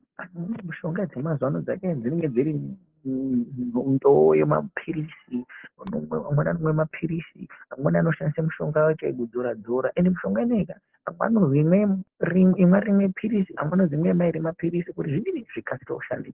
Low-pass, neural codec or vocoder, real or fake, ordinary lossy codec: 3.6 kHz; none; real; Opus, 16 kbps